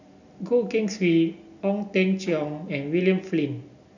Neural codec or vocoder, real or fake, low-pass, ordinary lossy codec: none; real; 7.2 kHz; none